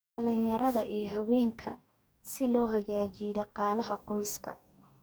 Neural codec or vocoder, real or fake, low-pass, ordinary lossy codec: codec, 44.1 kHz, 2.6 kbps, DAC; fake; none; none